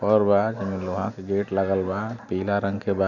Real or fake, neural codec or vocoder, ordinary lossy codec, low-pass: real; none; none; 7.2 kHz